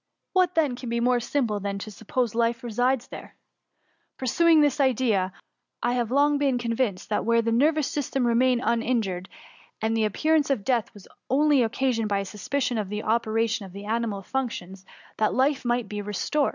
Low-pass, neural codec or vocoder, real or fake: 7.2 kHz; none; real